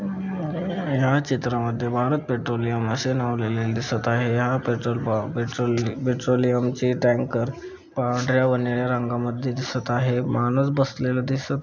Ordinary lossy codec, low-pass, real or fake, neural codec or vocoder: none; 7.2 kHz; real; none